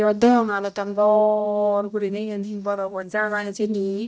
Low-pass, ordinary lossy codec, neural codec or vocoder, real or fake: none; none; codec, 16 kHz, 0.5 kbps, X-Codec, HuBERT features, trained on general audio; fake